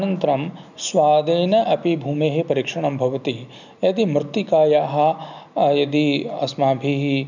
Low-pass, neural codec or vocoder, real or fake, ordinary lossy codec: 7.2 kHz; none; real; none